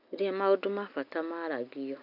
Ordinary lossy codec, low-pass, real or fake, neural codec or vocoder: none; 5.4 kHz; real; none